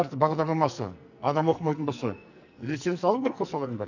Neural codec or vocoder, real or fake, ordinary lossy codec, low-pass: codec, 44.1 kHz, 2.6 kbps, SNAC; fake; none; 7.2 kHz